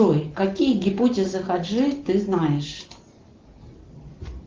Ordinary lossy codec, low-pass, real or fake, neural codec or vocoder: Opus, 16 kbps; 7.2 kHz; real; none